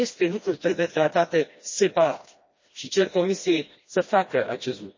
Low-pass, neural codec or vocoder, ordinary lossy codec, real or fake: 7.2 kHz; codec, 16 kHz, 1 kbps, FreqCodec, smaller model; MP3, 32 kbps; fake